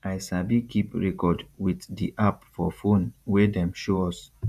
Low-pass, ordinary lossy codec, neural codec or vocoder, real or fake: 14.4 kHz; none; vocoder, 48 kHz, 128 mel bands, Vocos; fake